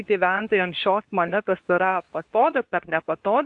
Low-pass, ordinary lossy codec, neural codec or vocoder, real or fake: 10.8 kHz; AAC, 64 kbps; codec, 24 kHz, 0.9 kbps, WavTokenizer, medium speech release version 2; fake